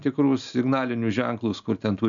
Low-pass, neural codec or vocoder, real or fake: 7.2 kHz; none; real